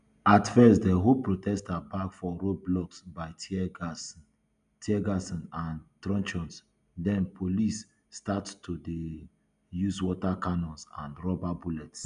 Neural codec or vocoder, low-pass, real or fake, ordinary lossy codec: none; 9.9 kHz; real; none